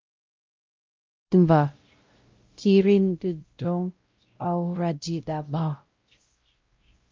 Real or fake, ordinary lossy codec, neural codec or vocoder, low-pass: fake; Opus, 24 kbps; codec, 16 kHz, 0.5 kbps, X-Codec, WavLM features, trained on Multilingual LibriSpeech; 7.2 kHz